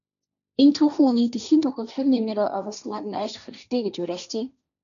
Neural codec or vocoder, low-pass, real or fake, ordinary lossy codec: codec, 16 kHz, 1.1 kbps, Voila-Tokenizer; 7.2 kHz; fake; MP3, 96 kbps